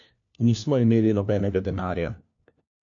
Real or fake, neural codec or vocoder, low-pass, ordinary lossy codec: fake; codec, 16 kHz, 1 kbps, FunCodec, trained on LibriTTS, 50 frames a second; 7.2 kHz; AAC, 64 kbps